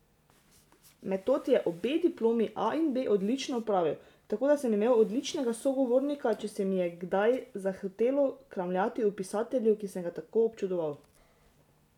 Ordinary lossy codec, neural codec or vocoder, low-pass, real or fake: none; none; 19.8 kHz; real